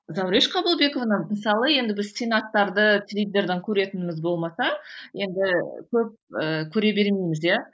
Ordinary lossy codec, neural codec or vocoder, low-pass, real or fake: none; none; none; real